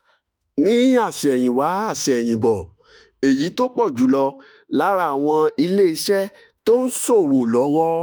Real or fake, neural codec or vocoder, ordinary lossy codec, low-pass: fake; autoencoder, 48 kHz, 32 numbers a frame, DAC-VAE, trained on Japanese speech; none; none